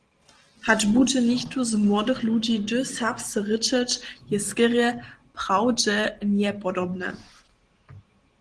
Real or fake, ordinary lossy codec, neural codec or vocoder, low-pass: real; Opus, 16 kbps; none; 9.9 kHz